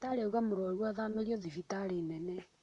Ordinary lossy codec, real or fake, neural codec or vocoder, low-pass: none; fake; vocoder, 44.1 kHz, 128 mel bands, Pupu-Vocoder; 10.8 kHz